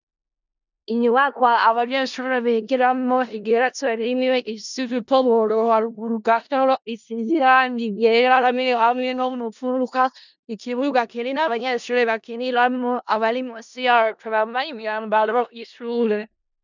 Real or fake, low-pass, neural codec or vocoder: fake; 7.2 kHz; codec, 16 kHz in and 24 kHz out, 0.4 kbps, LongCat-Audio-Codec, four codebook decoder